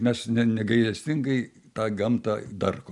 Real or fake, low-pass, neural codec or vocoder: real; 10.8 kHz; none